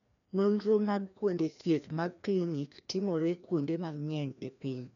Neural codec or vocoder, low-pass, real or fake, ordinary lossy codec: codec, 16 kHz, 1 kbps, FreqCodec, larger model; 7.2 kHz; fake; none